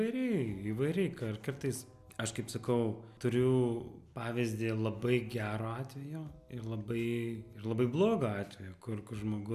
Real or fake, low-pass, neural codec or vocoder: real; 14.4 kHz; none